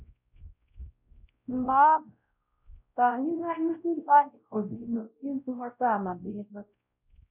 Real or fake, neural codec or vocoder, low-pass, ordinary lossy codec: fake; codec, 16 kHz, 0.5 kbps, X-Codec, WavLM features, trained on Multilingual LibriSpeech; 3.6 kHz; none